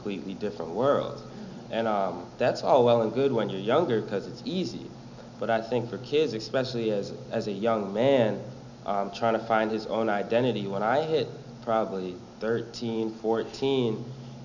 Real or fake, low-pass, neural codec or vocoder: real; 7.2 kHz; none